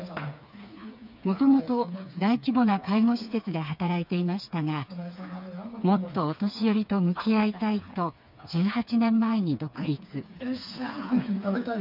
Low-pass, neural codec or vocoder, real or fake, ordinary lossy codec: 5.4 kHz; codec, 16 kHz, 4 kbps, FreqCodec, smaller model; fake; none